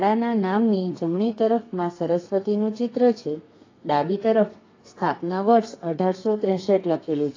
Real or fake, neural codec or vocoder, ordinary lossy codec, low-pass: fake; codec, 32 kHz, 1.9 kbps, SNAC; AAC, 32 kbps; 7.2 kHz